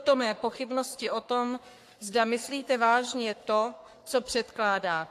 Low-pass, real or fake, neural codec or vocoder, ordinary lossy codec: 14.4 kHz; fake; codec, 44.1 kHz, 3.4 kbps, Pupu-Codec; AAC, 64 kbps